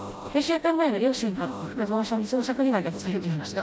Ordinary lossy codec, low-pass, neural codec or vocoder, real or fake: none; none; codec, 16 kHz, 0.5 kbps, FreqCodec, smaller model; fake